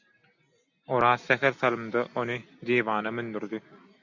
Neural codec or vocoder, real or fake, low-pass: none; real; 7.2 kHz